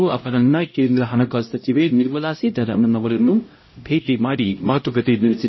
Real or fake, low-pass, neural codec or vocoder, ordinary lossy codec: fake; 7.2 kHz; codec, 16 kHz, 0.5 kbps, X-Codec, HuBERT features, trained on LibriSpeech; MP3, 24 kbps